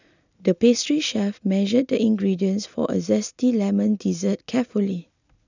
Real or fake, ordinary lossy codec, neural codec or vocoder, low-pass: real; none; none; 7.2 kHz